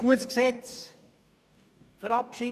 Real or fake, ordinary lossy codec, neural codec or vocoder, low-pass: fake; none; codec, 44.1 kHz, 2.6 kbps, DAC; 14.4 kHz